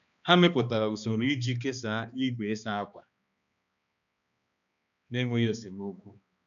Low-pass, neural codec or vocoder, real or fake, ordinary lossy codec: 7.2 kHz; codec, 16 kHz, 2 kbps, X-Codec, HuBERT features, trained on balanced general audio; fake; none